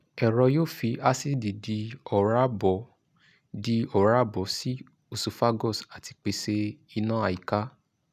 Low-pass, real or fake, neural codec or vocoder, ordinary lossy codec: 14.4 kHz; real; none; none